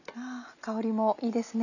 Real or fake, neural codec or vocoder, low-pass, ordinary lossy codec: real; none; 7.2 kHz; none